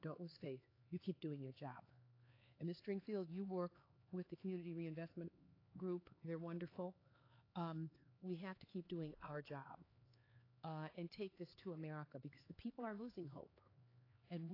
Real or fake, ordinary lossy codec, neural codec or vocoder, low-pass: fake; AAC, 24 kbps; codec, 16 kHz, 4 kbps, X-Codec, HuBERT features, trained on LibriSpeech; 5.4 kHz